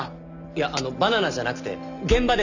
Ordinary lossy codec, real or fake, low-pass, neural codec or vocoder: none; real; 7.2 kHz; none